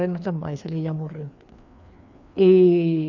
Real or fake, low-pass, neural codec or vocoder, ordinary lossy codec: fake; 7.2 kHz; codec, 16 kHz, 2 kbps, FreqCodec, larger model; none